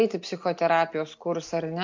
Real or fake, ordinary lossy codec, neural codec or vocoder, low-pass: real; MP3, 48 kbps; none; 7.2 kHz